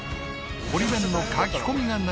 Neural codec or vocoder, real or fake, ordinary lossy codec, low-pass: none; real; none; none